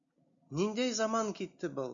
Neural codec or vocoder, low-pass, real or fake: none; 7.2 kHz; real